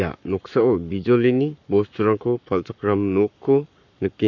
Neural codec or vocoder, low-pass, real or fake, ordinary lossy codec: codec, 44.1 kHz, 7.8 kbps, Pupu-Codec; 7.2 kHz; fake; none